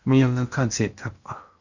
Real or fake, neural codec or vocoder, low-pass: fake; codec, 16 kHz in and 24 kHz out, 0.8 kbps, FocalCodec, streaming, 65536 codes; 7.2 kHz